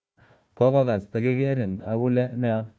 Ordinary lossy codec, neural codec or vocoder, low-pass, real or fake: none; codec, 16 kHz, 1 kbps, FunCodec, trained on Chinese and English, 50 frames a second; none; fake